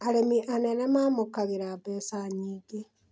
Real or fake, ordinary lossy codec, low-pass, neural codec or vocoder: real; none; none; none